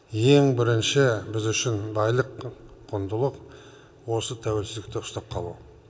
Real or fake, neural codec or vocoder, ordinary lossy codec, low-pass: real; none; none; none